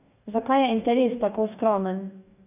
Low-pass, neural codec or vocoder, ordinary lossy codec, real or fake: 3.6 kHz; codec, 44.1 kHz, 2.6 kbps, DAC; none; fake